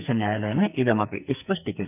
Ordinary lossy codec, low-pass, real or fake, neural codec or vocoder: none; 3.6 kHz; fake; codec, 44.1 kHz, 2.6 kbps, SNAC